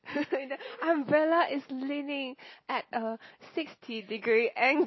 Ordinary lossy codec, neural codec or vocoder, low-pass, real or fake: MP3, 24 kbps; none; 7.2 kHz; real